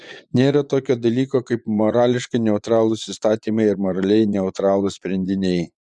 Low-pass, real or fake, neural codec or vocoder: 10.8 kHz; fake; vocoder, 24 kHz, 100 mel bands, Vocos